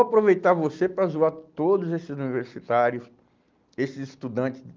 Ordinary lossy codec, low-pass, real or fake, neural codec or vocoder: Opus, 24 kbps; 7.2 kHz; real; none